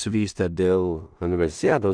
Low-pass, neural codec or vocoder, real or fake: 9.9 kHz; codec, 16 kHz in and 24 kHz out, 0.4 kbps, LongCat-Audio-Codec, two codebook decoder; fake